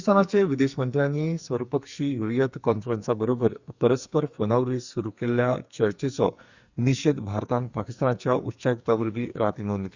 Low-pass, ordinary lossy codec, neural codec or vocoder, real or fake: 7.2 kHz; Opus, 64 kbps; codec, 44.1 kHz, 2.6 kbps, SNAC; fake